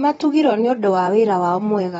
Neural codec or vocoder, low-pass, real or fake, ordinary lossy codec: vocoder, 44.1 kHz, 128 mel bands, Pupu-Vocoder; 19.8 kHz; fake; AAC, 24 kbps